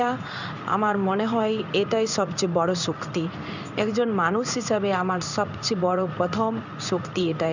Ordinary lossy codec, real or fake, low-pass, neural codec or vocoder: none; fake; 7.2 kHz; codec, 16 kHz in and 24 kHz out, 1 kbps, XY-Tokenizer